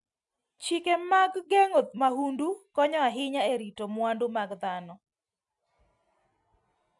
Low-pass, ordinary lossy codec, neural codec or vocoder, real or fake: 10.8 kHz; none; none; real